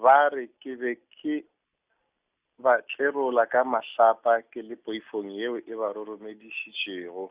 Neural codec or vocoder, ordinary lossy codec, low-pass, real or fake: none; Opus, 64 kbps; 3.6 kHz; real